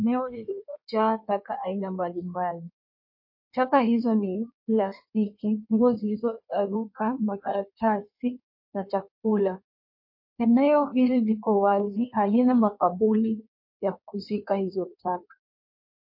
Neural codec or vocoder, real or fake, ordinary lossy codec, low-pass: codec, 16 kHz in and 24 kHz out, 1.1 kbps, FireRedTTS-2 codec; fake; MP3, 32 kbps; 5.4 kHz